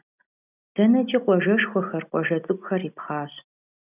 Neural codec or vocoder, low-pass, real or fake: none; 3.6 kHz; real